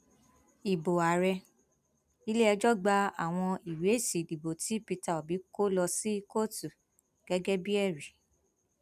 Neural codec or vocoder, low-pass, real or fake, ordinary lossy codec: none; 14.4 kHz; real; none